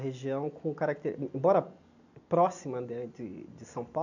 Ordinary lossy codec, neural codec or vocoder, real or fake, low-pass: none; none; real; 7.2 kHz